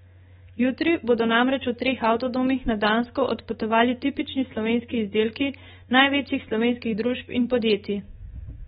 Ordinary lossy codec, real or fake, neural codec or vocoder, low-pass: AAC, 16 kbps; fake; autoencoder, 48 kHz, 128 numbers a frame, DAC-VAE, trained on Japanese speech; 19.8 kHz